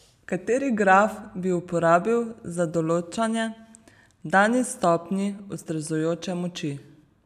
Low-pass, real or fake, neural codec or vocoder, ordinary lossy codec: 14.4 kHz; real; none; none